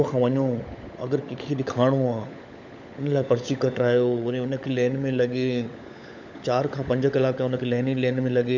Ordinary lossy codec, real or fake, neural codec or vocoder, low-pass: none; fake; codec, 16 kHz, 16 kbps, FunCodec, trained on LibriTTS, 50 frames a second; 7.2 kHz